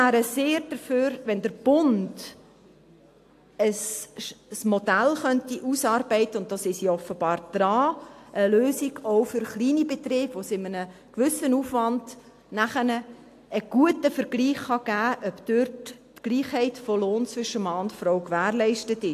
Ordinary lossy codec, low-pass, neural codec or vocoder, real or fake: AAC, 64 kbps; 14.4 kHz; none; real